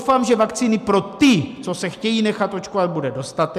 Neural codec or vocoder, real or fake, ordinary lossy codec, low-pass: none; real; MP3, 96 kbps; 14.4 kHz